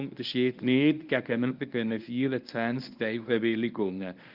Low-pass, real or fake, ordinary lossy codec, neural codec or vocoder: 5.4 kHz; fake; Opus, 32 kbps; codec, 24 kHz, 0.9 kbps, WavTokenizer, medium speech release version 1